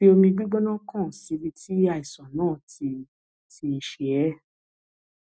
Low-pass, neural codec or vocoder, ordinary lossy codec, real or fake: none; none; none; real